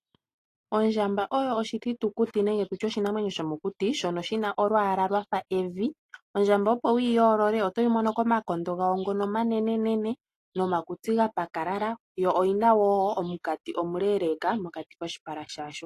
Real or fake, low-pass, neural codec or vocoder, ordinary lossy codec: real; 14.4 kHz; none; AAC, 48 kbps